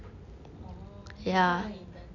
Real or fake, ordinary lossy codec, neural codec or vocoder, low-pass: real; none; none; 7.2 kHz